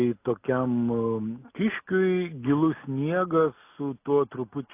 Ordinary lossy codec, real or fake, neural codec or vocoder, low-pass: AAC, 24 kbps; real; none; 3.6 kHz